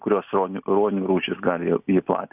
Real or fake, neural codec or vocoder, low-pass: real; none; 3.6 kHz